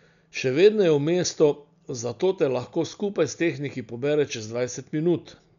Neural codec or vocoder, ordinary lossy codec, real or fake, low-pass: none; none; real; 7.2 kHz